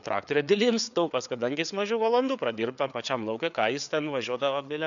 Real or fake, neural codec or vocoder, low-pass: fake; codec, 16 kHz, 4 kbps, FreqCodec, larger model; 7.2 kHz